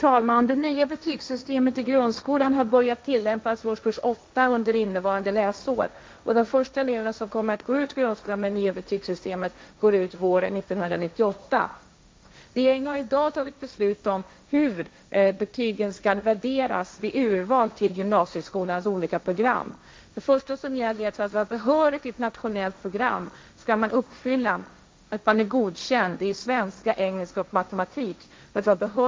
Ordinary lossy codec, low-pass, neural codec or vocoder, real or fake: none; none; codec, 16 kHz, 1.1 kbps, Voila-Tokenizer; fake